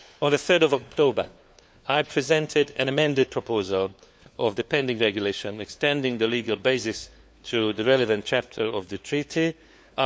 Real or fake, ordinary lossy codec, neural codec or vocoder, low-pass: fake; none; codec, 16 kHz, 4 kbps, FunCodec, trained on LibriTTS, 50 frames a second; none